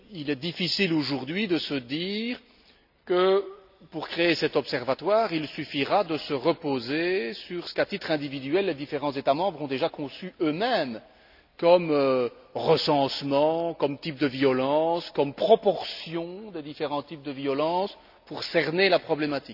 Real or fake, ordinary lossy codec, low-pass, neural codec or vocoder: real; none; 5.4 kHz; none